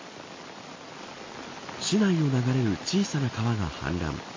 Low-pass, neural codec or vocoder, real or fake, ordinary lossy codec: 7.2 kHz; none; real; MP3, 32 kbps